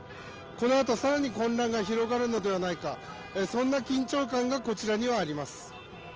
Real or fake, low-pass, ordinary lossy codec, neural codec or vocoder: real; 7.2 kHz; Opus, 24 kbps; none